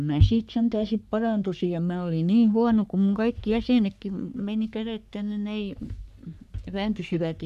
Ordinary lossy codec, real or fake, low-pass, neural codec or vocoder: none; fake; 14.4 kHz; codec, 44.1 kHz, 3.4 kbps, Pupu-Codec